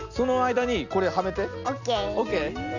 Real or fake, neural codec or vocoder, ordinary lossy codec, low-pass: real; none; none; 7.2 kHz